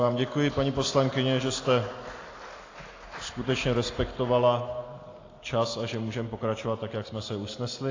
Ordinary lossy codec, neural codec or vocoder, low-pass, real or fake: AAC, 32 kbps; none; 7.2 kHz; real